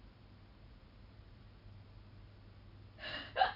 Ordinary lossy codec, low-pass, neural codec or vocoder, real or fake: none; 5.4 kHz; none; real